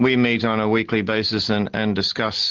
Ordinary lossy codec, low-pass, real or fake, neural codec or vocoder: Opus, 16 kbps; 7.2 kHz; real; none